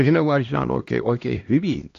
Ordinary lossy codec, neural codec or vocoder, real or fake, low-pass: AAC, 48 kbps; codec, 16 kHz, 1 kbps, X-Codec, HuBERT features, trained on LibriSpeech; fake; 7.2 kHz